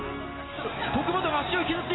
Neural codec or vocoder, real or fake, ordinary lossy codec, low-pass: none; real; AAC, 16 kbps; 7.2 kHz